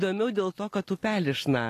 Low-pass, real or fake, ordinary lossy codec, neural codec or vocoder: 14.4 kHz; real; AAC, 48 kbps; none